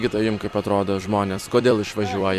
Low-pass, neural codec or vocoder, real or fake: 14.4 kHz; vocoder, 44.1 kHz, 128 mel bands every 256 samples, BigVGAN v2; fake